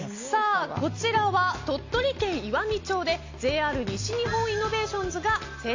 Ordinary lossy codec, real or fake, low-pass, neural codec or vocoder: AAC, 48 kbps; real; 7.2 kHz; none